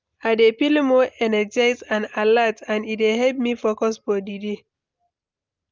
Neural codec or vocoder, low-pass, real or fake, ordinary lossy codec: none; 7.2 kHz; real; Opus, 32 kbps